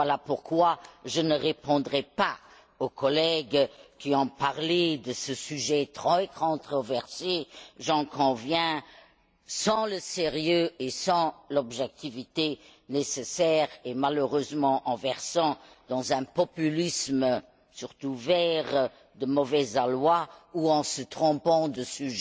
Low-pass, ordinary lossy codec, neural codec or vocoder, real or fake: none; none; none; real